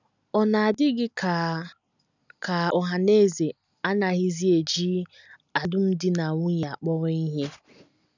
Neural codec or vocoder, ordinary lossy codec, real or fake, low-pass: none; none; real; 7.2 kHz